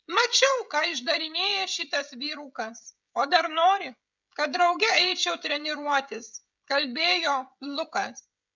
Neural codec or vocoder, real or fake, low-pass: codec, 16 kHz, 16 kbps, FreqCodec, smaller model; fake; 7.2 kHz